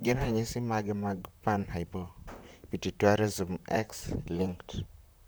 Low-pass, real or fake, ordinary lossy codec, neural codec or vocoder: none; fake; none; vocoder, 44.1 kHz, 128 mel bands, Pupu-Vocoder